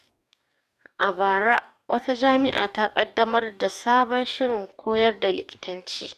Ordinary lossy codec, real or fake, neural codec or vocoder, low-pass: none; fake; codec, 44.1 kHz, 2.6 kbps, DAC; 14.4 kHz